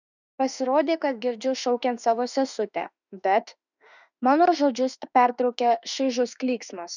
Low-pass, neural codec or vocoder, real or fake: 7.2 kHz; autoencoder, 48 kHz, 32 numbers a frame, DAC-VAE, trained on Japanese speech; fake